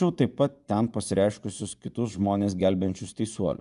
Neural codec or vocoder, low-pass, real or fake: vocoder, 24 kHz, 100 mel bands, Vocos; 10.8 kHz; fake